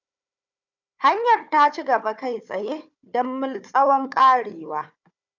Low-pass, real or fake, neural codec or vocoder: 7.2 kHz; fake; codec, 16 kHz, 4 kbps, FunCodec, trained on Chinese and English, 50 frames a second